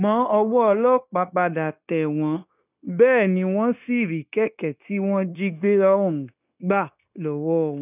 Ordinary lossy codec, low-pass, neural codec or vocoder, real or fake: none; 3.6 kHz; codec, 16 kHz, 0.9 kbps, LongCat-Audio-Codec; fake